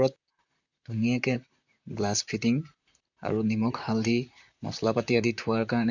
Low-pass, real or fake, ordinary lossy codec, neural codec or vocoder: 7.2 kHz; fake; none; vocoder, 44.1 kHz, 128 mel bands, Pupu-Vocoder